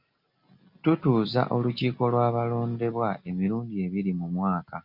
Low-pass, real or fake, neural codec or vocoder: 5.4 kHz; real; none